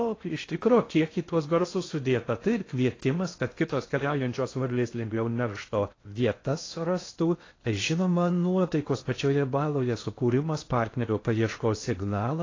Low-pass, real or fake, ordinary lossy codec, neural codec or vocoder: 7.2 kHz; fake; AAC, 32 kbps; codec, 16 kHz in and 24 kHz out, 0.6 kbps, FocalCodec, streaming, 2048 codes